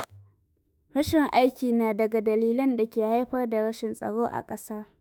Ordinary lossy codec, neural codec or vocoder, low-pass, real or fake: none; autoencoder, 48 kHz, 32 numbers a frame, DAC-VAE, trained on Japanese speech; none; fake